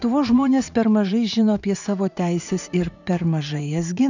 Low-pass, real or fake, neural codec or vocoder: 7.2 kHz; fake; vocoder, 44.1 kHz, 80 mel bands, Vocos